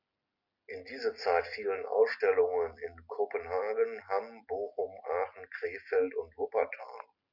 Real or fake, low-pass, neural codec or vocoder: real; 5.4 kHz; none